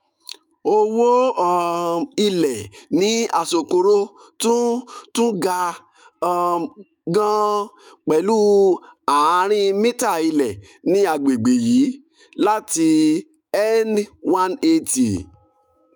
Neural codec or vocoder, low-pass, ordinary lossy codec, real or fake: autoencoder, 48 kHz, 128 numbers a frame, DAC-VAE, trained on Japanese speech; none; none; fake